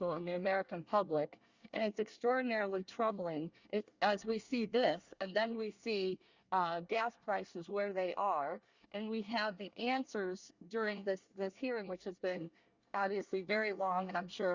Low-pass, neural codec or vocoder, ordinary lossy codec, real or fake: 7.2 kHz; codec, 24 kHz, 1 kbps, SNAC; Opus, 64 kbps; fake